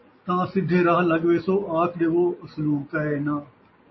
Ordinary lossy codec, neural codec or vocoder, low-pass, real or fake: MP3, 24 kbps; none; 7.2 kHz; real